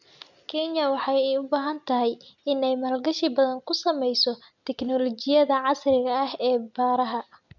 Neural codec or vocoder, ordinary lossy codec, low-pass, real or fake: none; none; 7.2 kHz; real